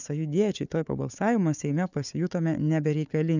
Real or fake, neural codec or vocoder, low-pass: fake; codec, 16 kHz, 4 kbps, FunCodec, trained on Chinese and English, 50 frames a second; 7.2 kHz